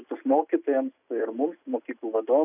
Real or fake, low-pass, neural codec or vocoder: real; 3.6 kHz; none